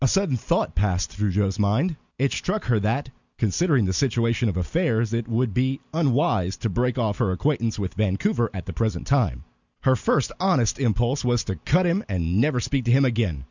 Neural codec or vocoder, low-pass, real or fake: none; 7.2 kHz; real